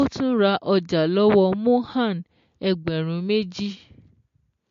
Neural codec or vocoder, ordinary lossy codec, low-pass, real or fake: none; MP3, 48 kbps; 7.2 kHz; real